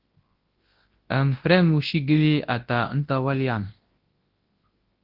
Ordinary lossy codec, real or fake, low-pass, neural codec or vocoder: Opus, 16 kbps; fake; 5.4 kHz; codec, 24 kHz, 0.9 kbps, WavTokenizer, large speech release